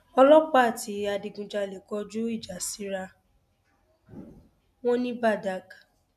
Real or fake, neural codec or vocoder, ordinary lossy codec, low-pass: real; none; none; 14.4 kHz